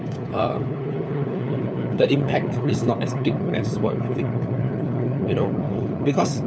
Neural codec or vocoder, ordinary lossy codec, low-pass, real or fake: codec, 16 kHz, 4 kbps, FunCodec, trained on LibriTTS, 50 frames a second; none; none; fake